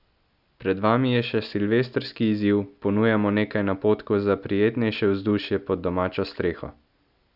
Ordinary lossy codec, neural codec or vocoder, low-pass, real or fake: none; none; 5.4 kHz; real